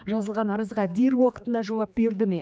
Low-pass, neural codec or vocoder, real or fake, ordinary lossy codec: none; codec, 16 kHz, 2 kbps, X-Codec, HuBERT features, trained on general audio; fake; none